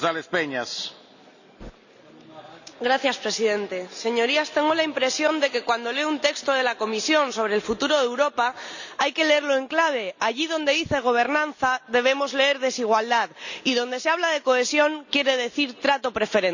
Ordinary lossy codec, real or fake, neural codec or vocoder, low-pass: none; real; none; 7.2 kHz